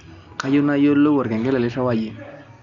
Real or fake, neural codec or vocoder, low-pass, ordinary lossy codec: real; none; 7.2 kHz; none